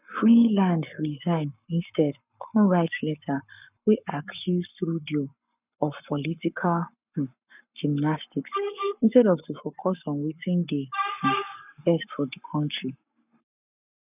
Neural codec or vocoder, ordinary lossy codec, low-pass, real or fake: codec, 44.1 kHz, 7.8 kbps, Pupu-Codec; none; 3.6 kHz; fake